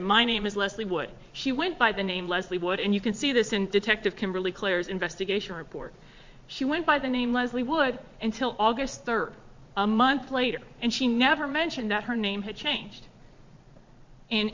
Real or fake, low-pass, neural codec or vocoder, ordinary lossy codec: fake; 7.2 kHz; vocoder, 22.05 kHz, 80 mel bands, Vocos; MP3, 48 kbps